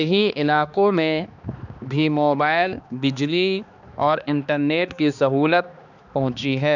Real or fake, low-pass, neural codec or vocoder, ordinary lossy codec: fake; 7.2 kHz; codec, 16 kHz, 2 kbps, X-Codec, HuBERT features, trained on balanced general audio; none